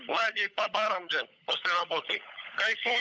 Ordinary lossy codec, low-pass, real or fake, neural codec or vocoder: none; none; fake; codec, 16 kHz, 16 kbps, FunCodec, trained on LibriTTS, 50 frames a second